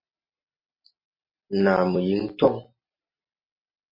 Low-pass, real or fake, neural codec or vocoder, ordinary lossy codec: 5.4 kHz; real; none; MP3, 24 kbps